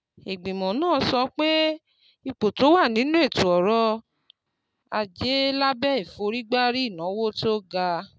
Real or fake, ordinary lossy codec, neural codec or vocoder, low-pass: real; none; none; none